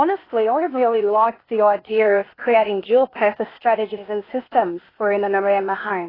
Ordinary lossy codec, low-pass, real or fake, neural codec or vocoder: AAC, 24 kbps; 5.4 kHz; fake; codec, 16 kHz, 0.8 kbps, ZipCodec